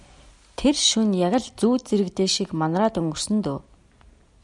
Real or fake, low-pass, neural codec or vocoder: real; 10.8 kHz; none